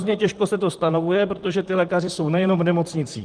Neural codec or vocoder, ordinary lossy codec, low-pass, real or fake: vocoder, 44.1 kHz, 128 mel bands, Pupu-Vocoder; Opus, 24 kbps; 9.9 kHz; fake